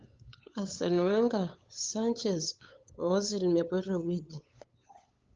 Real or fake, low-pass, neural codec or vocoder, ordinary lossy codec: fake; 7.2 kHz; codec, 16 kHz, 8 kbps, FunCodec, trained on LibriTTS, 25 frames a second; Opus, 24 kbps